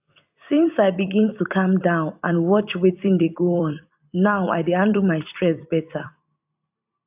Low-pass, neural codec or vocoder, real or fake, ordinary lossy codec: 3.6 kHz; vocoder, 44.1 kHz, 128 mel bands every 256 samples, BigVGAN v2; fake; AAC, 32 kbps